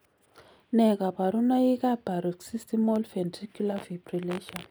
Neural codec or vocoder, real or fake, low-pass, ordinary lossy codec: none; real; none; none